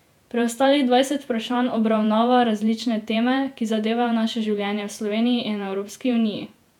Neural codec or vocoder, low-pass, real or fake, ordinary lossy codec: vocoder, 48 kHz, 128 mel bands, Vocos; 19.8 kHz; fake; none